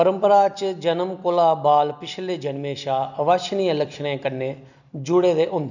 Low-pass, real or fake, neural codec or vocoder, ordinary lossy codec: 7.2 kHz; real; none; none